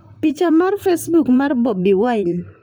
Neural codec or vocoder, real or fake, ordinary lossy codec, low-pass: vocoder, 44.1 kHz, 128 mel bands, Pupu-Vocoder; fake; none; none